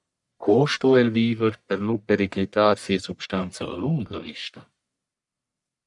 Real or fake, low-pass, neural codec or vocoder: fake; 10.8 kHz; codec, 44.1 kHz, 1.7 kbps, Pupu-Codec